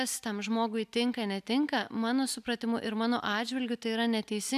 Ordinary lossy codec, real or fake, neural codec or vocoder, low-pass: AAC, 96 kbps; real; none; 14.4 kHz